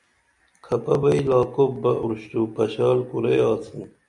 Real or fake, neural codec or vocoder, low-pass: real; none; 10.8 kHz